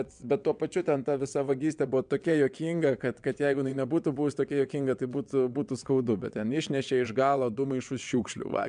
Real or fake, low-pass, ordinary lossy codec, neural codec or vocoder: fake; 9.9 kHz; MP3, 96 kbps; vocoder, 22.05 kHz, 80 mel bands, WaveNeXt